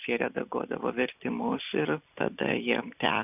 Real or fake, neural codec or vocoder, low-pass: real; none; 3.6 kHz